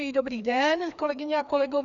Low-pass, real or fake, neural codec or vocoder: 7.2 kHz; fake; codec, 16 kHz, 2 kbps, FreqCodec, larger model